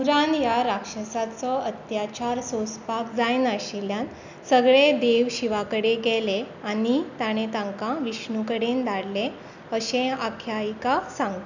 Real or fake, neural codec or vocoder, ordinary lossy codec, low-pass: real; none; none; 7.2 kHz